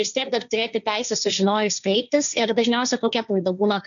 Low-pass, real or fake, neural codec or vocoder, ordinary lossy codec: 7.2 kHz; fake; codec, 16 kHz, 1.1 kbps, Voila-Tokenizer; MP3, 96 kbps